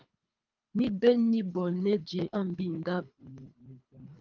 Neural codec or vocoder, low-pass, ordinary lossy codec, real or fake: codec, 24 kHz, 3 kbps, HILCodec; 7.2 kHz; Opus, 32 kbps; fake